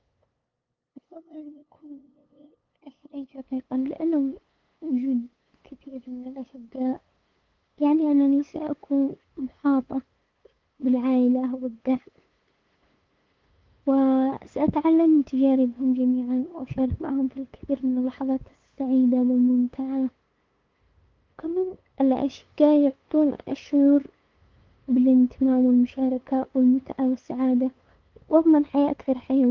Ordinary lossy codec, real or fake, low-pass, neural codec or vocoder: Opus, 32 kbps; fake; 7.2 kHz; codec, 16 kHz, 8 kbps, FunCodec, trained on LibriTTS, 25 frames a second